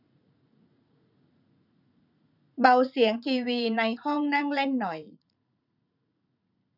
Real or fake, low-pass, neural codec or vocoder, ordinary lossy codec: fake; 5.4 kHz; vocoder, 44.1 kHz, 80 mel bands, Vocos; none